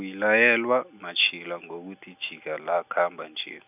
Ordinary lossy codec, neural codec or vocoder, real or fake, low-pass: none; none; real; 3.6 kHz